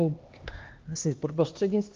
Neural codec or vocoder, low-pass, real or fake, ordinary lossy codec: codec, 16 kHz, 2 kbps, X-Codec, HuBERT features, trained on LibriSpeech; 7.2 kHz; fake; Opus, 32 kbps